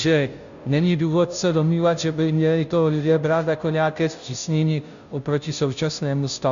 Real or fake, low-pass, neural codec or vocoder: fake; 7.2 kHz; codec, 16 kHz, 0.5 kbps, FunCodec, trained on Chinese and English, 25 frames a second